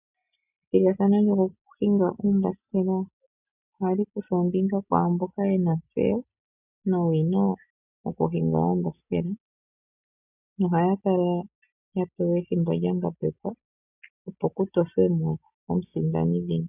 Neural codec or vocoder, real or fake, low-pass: none; real; 3.6 kHz